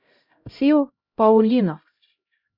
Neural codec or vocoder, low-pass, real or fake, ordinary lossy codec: codec, 16 kHz, 0.5 kbps, X-Codec, HuBERT features, trained on LibriSpeech; 5.4 kHz; fake; Opus, 64 kbps